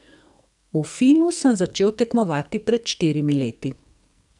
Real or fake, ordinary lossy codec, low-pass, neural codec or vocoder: fake; none; 10.8 kHz; codec, 44.1 kHz, 2.6 kbps, SNAC